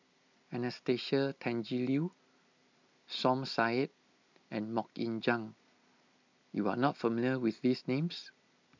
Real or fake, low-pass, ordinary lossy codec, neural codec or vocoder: real; 7.2 kHz; MP3, 64 kbps; none